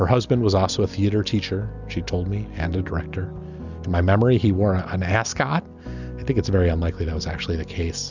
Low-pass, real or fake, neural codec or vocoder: 7.2 kHz; real; none